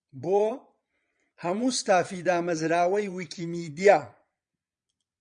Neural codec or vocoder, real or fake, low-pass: vocoder, 22.05 kHz, 80 mel bands, Vocos; fake; 9.9 kHz